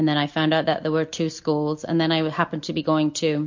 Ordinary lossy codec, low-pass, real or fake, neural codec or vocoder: MP3, 48 kbps; 7.2 kHz; real; none